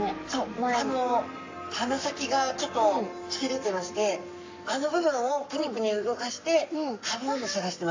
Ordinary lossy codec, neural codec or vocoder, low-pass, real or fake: AAC, 48 kbps; codec, 44.1 kHz, 7.8 kbps, Pupu-Codec; 7.2 kHz; fake